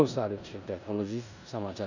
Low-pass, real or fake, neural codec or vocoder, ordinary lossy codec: 7.2 kHz; fake; codec, 16 kHz in and 24 kHz out, 0.9 kbps, LongCat-Audio-Codec, four codebook decoder; none